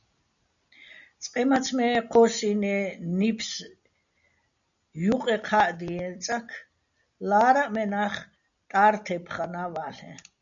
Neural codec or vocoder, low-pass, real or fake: none; 7.2 kHz; real